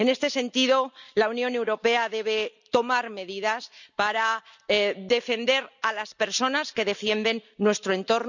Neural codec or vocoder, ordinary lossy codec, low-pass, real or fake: none; none; 7.2 kHz; real